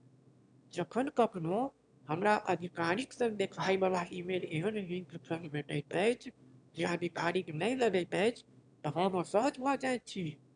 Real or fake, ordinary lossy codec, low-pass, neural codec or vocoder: fake; none; 9.9 kHz; autoencoder, 22.05 kHz, a latent of 192 numbers a frame, VITS, trained on one speaker